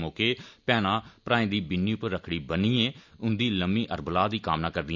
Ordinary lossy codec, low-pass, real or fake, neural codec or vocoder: MP3, 64 kbps; 7.2 kHz; real; none